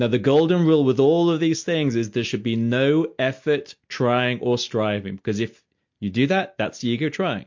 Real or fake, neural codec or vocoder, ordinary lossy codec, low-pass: real; none; MP3, 48 kbps; 7.2 kHz